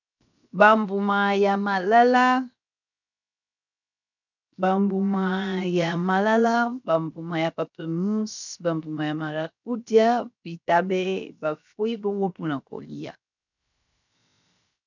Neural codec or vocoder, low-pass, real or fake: codec, 16 kHz, 0.7 kbps, FocalCodec; 7.2 kHz; fake